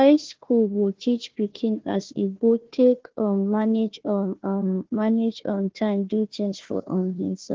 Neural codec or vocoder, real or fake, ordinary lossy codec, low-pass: codec, 16 kHz, 1 kbps, FunCodec, trained on Chinese and English, 50 frames a second; fake; Opus, 16 kbps; 7.2 kHz